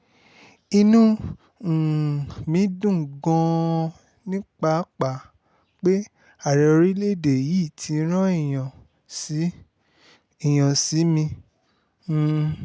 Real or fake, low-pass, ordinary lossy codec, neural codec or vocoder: real; none; none; none